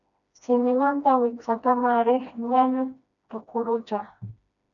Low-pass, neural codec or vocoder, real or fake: 7.2 kHz; codec, 16 kHz, 1 kbps, FreqCodec, smaller model; fake